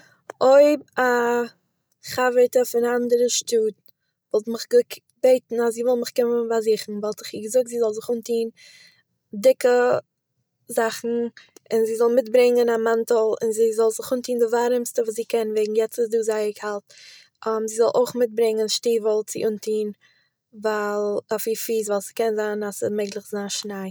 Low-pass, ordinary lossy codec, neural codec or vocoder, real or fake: none; none; none; real